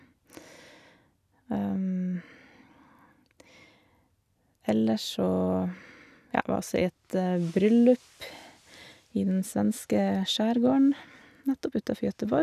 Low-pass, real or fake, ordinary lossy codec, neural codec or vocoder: 14.4 kHz; real; none; none